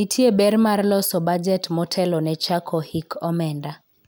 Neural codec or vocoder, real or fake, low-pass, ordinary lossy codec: none; real; none; none